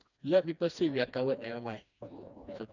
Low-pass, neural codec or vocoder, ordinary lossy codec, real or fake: 7.2 kHz; codec, 16 kHz, 2 kbps, FreqCodec, smaller model; none; fake